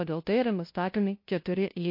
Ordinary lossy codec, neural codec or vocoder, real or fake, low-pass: MP3, 32 kbps; codec, 16 kHz, 0.5 kbps, FunCodec, trained on LibriTTS, 25 frames a second; fake; 5.4 kHz